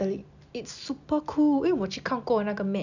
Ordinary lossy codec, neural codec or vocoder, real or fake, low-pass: none; none; real; 7.2 kHz